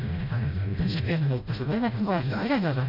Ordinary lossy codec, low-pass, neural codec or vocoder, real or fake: none; 5.4 kHz; codec, 16 kHz, 0.5 kbps, FreqCodec, smaller model; fake